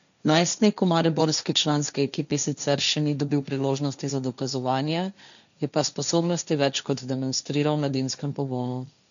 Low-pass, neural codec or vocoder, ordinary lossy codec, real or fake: 7.2 kHz; codec, 16 kHz, 1.1 kbps, Voila-Tokenizer; none; fake